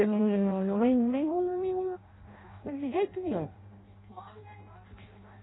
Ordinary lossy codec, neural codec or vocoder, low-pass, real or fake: AAC, 16 kbps; codec, 16 kHz in and 24 kHz out, 0.6 kbps, FireRedTTS-2 codec; 7.2 kHz; fake